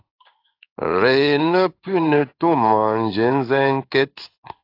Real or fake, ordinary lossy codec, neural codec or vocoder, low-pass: fake; AAC, 32 kbps; codec, 16 kHz in and 24 kHz out, 1 kbps, XY-Tokenizer; 5.4 kHz